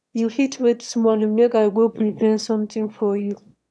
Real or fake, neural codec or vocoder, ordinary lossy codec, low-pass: fake; autoencoder, 22.05 kHz, a latent of 192 numbers a frame, VITS, trained on one speaker; none; none